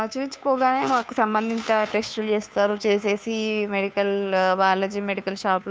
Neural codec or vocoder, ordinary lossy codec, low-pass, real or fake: codec, 16 kHz, 2 kbps, FunCodec, trained on Chinese and English, 25 frames a second; none; none; fake